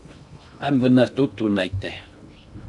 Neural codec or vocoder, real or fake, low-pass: codec, 16 kHz in and 24 kHz out, 0.6 kbps, FocalCodec, streaming, 2048 codes; fake; 10.8 kHz